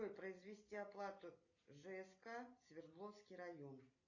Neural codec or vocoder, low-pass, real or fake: none; 7.2 kHz; real